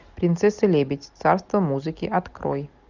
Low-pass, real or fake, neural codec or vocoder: 7.2 kHz; real; none